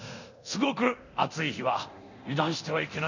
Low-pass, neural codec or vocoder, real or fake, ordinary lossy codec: 7.2 kHz; codec, 24 kHz, 0.9 kbps, DualCodec; fake; none